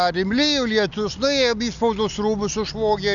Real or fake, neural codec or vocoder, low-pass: real; none; 7.2 kHz